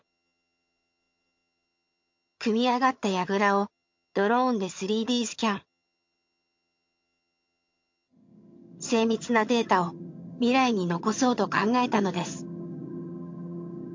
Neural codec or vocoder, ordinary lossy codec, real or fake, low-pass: vocoder, 22.05 kHz, 80 mel bands, HiFi-GAN; MP3, 48 kbps; fake; 7.2 kHz